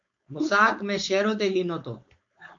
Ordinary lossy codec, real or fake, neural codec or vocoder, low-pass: MP3, 48 kbps; fake; codec, 16 kHz, 4.8 kbps, FACodec; 7.2 kHz